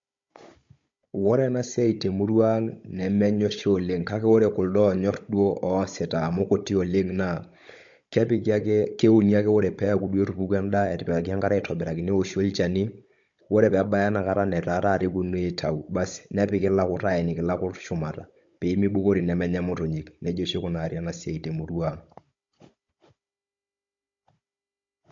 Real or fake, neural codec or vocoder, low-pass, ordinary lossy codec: fake; codec, 16 kHz, 16 kbps, FunCodec, trained on Chinese and English, 50 frames a second; 7.2 kHz; MP3, 48 kbps